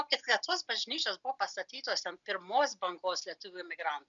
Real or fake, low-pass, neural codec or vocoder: real; 7.2 kHz; none